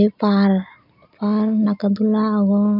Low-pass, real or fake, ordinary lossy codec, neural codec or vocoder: 5.4 kHz; real; none; none